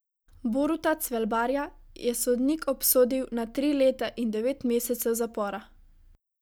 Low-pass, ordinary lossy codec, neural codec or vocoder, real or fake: none; none; none; real